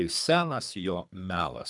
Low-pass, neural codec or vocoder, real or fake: 10.8 kHz; codec, 24 kHz, 3 kbps, HILCodec; fake